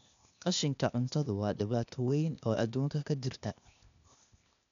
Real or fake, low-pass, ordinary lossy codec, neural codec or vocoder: fake; 7.2 kHz; none; codec, 16 kHz, 0.8 kbps, ZipCodec